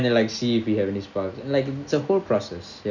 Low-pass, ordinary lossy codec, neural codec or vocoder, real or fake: 7.2 kHz; none; none; real